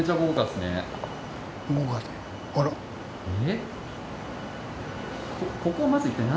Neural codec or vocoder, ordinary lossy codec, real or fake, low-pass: none; none; real; none